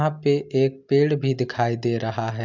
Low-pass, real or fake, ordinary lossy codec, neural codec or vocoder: 7.2 kHz; real; MP3, 64 kbps; none